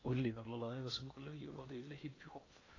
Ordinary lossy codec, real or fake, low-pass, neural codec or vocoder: AAC, 32 kbps; fake; 7.2 kHz; codec, 16 kHz in and 24 kHz out, 0.8 kbps, FocalCodec, streaming, 65536 codes